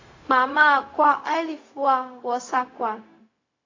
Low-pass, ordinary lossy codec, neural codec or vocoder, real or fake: 7.2 kHz; AAC, 32 kbps; codec, 16 kHz, 0.4 kbps, LongCat-Audio-Codec; fake